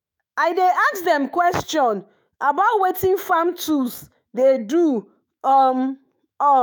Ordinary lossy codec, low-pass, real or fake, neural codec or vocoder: none; none; fake; autoencoder, 48 kHz, 128 numbers a frame, DAC-VAE, trained on Japanese speech